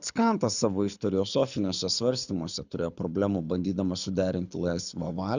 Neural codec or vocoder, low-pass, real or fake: codec, 24 kHz, 6 kbps, HILCodec; 7.2 kHz; fake